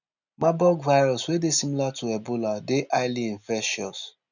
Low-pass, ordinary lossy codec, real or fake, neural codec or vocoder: 7.2 kHz; none; real; none